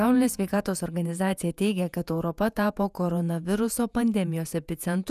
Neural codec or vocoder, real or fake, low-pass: vocoder, 48 kHz, 128 mel bands, Vocos; fake; 14.4 kHz